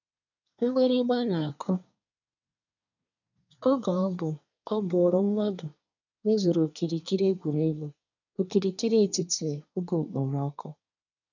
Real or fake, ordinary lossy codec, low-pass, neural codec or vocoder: fake; none; 7.2 kHz; codec, 24 kHz, 1 kbps, SNAC